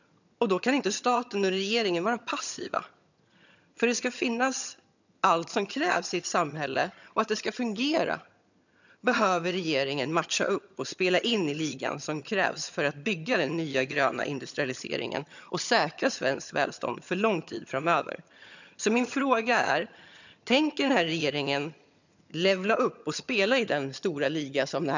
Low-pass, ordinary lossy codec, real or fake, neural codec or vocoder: 7.2 kHz; none; fake; vocoder, 22.05 kHz, 80 mel bands, HiFi-GAN